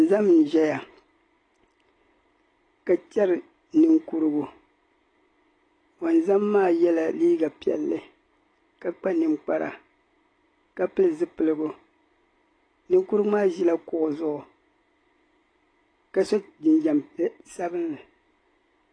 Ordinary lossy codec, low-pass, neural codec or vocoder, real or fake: AAC, 32 kbps; 9.9 kHz; none; real